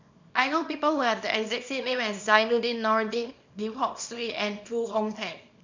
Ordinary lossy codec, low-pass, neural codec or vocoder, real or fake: MP3, 64 kbps; 7.2 kHz; codec, 24 kHz, 0.9 kbps, WavTokenizer, small release; fake